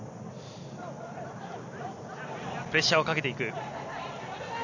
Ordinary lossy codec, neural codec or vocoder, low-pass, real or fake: none; none; 7.2 kHz; real